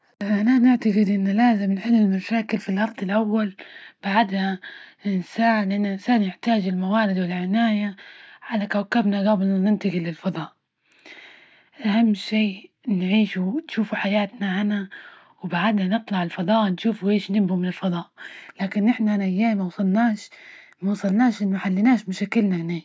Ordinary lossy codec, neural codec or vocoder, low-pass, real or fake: none; none; none; real